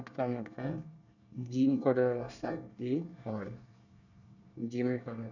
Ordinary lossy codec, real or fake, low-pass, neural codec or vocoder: none; fake; 7.2 kHz; codec, 24 kHz, 1 kbps, SNAC